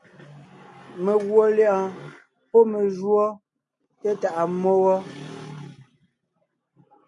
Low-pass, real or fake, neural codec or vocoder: 10.8 kHz; real; none